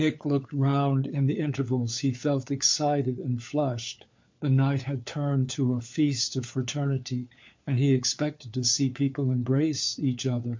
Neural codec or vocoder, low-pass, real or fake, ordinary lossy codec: codec, 16 kHz, 4 kbps, FunCodec, trained on LibriTTS, 50 frames a second; 7.2 kHz; fake; MP3, 48 kbps